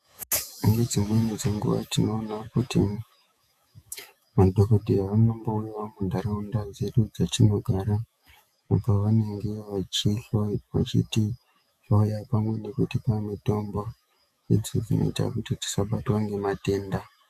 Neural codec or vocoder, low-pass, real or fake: autoencoder, 48 kHz, 128 numbers a frame, DAC-VAE, trained on Japanese speech; 14.4 kHz; fake